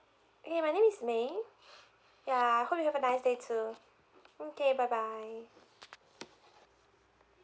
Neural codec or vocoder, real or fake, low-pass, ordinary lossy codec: none; real; none; none